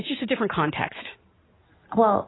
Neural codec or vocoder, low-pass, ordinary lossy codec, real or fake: none; 7.2 kHz; AAC, 16 kbps; real